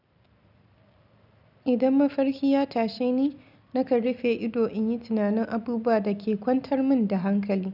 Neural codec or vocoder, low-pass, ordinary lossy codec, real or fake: none; 5.4 kHz; none; real